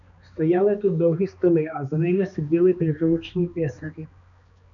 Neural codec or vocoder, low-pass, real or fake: codec, 16 kHz, 2 kbps, X-Codec, HuBERT features, trained on balanced general audio; 7.2 kHz; fake